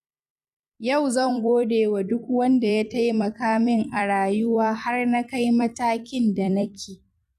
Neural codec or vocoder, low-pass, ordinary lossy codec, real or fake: vocoder, 44.1 kHz, 128 mel bands every 256 samples, BigVGAN v2; 14.4 kHz; none; fake